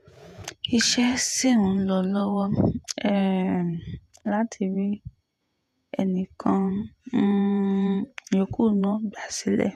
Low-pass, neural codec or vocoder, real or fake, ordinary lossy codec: 14.4 kHz; vocoder, 48 kHz, 128 mel bands, Vocos; fake; none